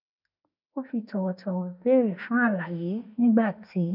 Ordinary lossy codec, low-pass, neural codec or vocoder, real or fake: none; 5.4 kHz; autoencoder, 48 kHz, 32 numbers a frame, DAC-VAE, trained on Japanese speech; fake